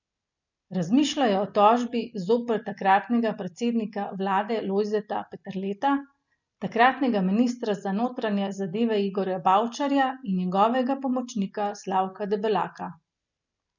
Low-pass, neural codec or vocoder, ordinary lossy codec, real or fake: 7.2 kHz; none; none; real